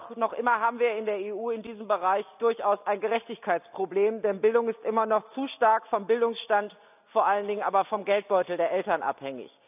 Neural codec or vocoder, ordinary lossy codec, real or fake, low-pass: none; none; real; 3.6 kHz